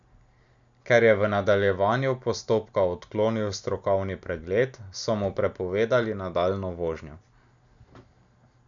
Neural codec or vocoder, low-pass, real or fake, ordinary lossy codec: none; 7.2 kHz; real; none